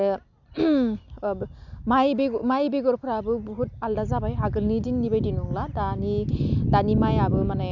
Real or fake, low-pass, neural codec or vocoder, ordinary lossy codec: real; 7.2 kHz; none; none